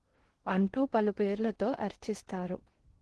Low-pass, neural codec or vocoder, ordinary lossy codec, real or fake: 10.8 kHz; codec, 16 kHz in and 24 kHz out, 0.8 kbps, FocalCodec, streaming, 65536 codes; Opus, 16 kbps; fake